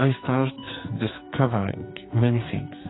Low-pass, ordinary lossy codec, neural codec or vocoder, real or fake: 7.2 kHz; AAC, 16 kbps; codec, 44.1 kHz, 2.6 kbps, SNAC; fake